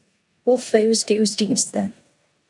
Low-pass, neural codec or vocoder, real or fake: 10.8 kHz; codec, 16 kHz in and 24 kHz out, 0.9 kbps, LongCat-Audio-Codec, four codebook decoder; fake